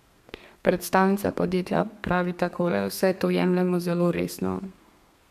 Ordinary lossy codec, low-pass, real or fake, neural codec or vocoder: MP3, 96 kbps; 14.4 kHz; fake; codec, 32 kHz, 1.9 kbps, SNAC